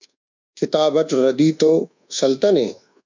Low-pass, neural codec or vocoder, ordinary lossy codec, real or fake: 7.2 kHz; codec, 24 kHz, 1.2 kbps, DualCodec; MP3, 64 kbps; fake